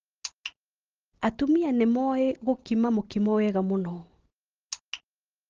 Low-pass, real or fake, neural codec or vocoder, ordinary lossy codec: 7.2 kHz; real; none; Opus, 16 kbps